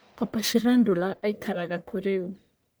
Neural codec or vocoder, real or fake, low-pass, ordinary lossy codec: codec, 44.1 kHz, 1.7 kbps, Pupu-Codec; fake; none; none